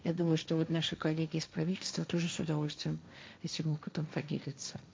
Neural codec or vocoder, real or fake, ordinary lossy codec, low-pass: codec, 16 kHz, 1.1 kbps, Voila-Tokenizer; fake; none; none